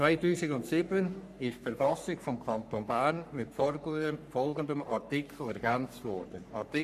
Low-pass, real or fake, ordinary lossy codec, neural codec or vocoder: 14.4 kHz; fake; none; codec, 44.1 kHz, 3.4 kbps, Pupu-Codec